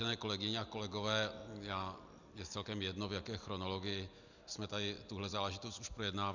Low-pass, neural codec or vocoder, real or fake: 7.2 kHz; none; real